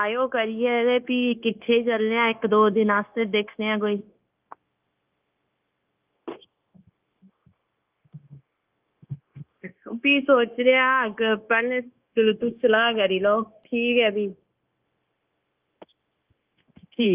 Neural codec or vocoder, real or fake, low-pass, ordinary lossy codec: codec, 16 kHz, 0.9 kbps, LongCat-Audio-Codec; fake; 3.6 kHz; Opus, 24 kbps